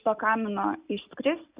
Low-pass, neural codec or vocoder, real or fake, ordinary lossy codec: 3.6 kHz; none; real; Opus, 64 kbps